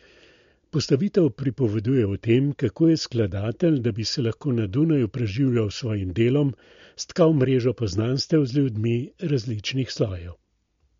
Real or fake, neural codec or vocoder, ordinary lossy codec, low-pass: real; none; MP3, 48 kbps; 7.2 kHz